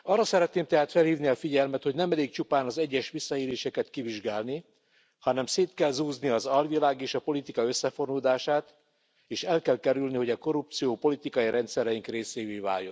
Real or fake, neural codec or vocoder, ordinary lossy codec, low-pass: real; none; none; none